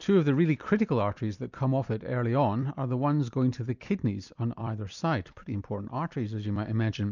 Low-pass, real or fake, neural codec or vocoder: 7.2 kHz; fake; vocoder, 44.1 kHz, 128 mel bands every 256 samples, BigVGAN v2